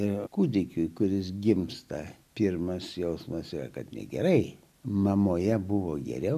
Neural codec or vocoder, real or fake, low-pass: none; real; 14.4 kHz